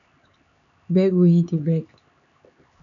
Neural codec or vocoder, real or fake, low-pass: codec, 16 kHz, 4 kbps, X-Codec, HuBERT features, trained on LibriSpeech; fake; 7.2 kHz